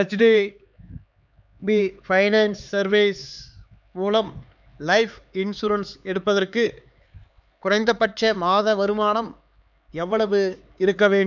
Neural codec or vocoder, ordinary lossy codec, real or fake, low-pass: codec, 16 kHz, 4 kbps, X-Codec, HuBERT features, trained on LibriSpeech; none; fake; 7.2 kHz